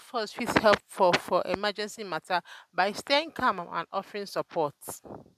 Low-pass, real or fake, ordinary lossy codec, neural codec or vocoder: 14.4 kHz; real; MP3, 96 kbps; none